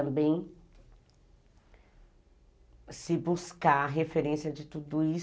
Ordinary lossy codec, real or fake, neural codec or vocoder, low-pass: none; real; none; none